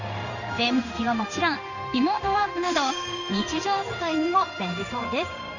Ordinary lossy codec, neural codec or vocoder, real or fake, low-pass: none; codec, 16 kHz in and 24 kHz out, 1 kbps, XY-Tokenizer; fake; 7.2 kHz